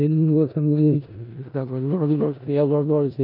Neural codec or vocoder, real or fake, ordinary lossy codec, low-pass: codec, 16 kHz in and 24 kHz out, 0.4 kbps, LongCat-Audio-Codec, four codebook decoder; fake; none; 5.4 kHz